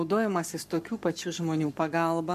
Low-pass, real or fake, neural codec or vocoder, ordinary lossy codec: 14.4 kHz; fake; codec, 44.1 kHz, 7.8 kbps, Pupu-Codec; AAC, 96 kbps